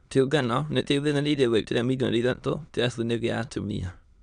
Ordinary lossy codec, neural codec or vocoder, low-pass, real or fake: none; autoencoder, 22.05 kHz, a latent of 192 numbers a frame, VITS, trained on many speakers; 9.9 kHz; fake